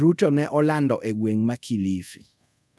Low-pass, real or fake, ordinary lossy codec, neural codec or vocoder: none; fake; none; codec, 24 kHz, 0.5 kbps, DualCodec